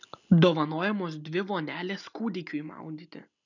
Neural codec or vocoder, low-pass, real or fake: none; 7.2 kHz; real